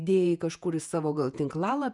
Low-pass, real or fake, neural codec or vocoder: 10.8 kHz; fake; vocoder, 48 kHz, 128 mel bands, Vocos